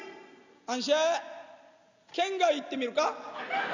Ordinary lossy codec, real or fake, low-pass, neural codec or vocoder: none; real; 7.2 kHz; none